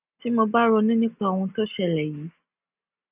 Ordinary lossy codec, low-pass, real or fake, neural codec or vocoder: none; 3.6 kHz; real; none